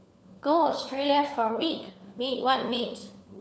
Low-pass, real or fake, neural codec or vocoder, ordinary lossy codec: none; fake; codec, 16 kHz, 4 kbps, FunCodec, trained on LibriTTS, 50 frames a second; none